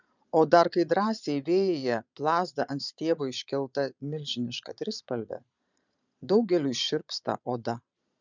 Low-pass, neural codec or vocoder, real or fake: 7.2 kHz; vocoder, 22.05 kHz, 80 mel bands, Vocos; fake